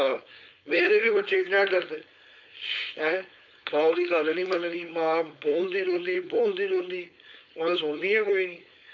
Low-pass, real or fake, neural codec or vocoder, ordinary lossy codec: 7.2 kHz; fake; codec, 16 kHz, 4.8 kbps, FACodec; MP3, 64 kbps